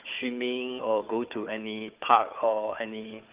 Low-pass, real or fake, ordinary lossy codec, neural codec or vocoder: 3.6 kHz; fake; Opus, 32 kbps; codec, 16 kHz, 4 kbps, FunCodec, trained on Chinese and English, 50 frames a second